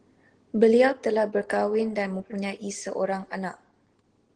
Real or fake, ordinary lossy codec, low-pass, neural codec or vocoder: real; Opus, 16 kbps; 9.9 kHz; none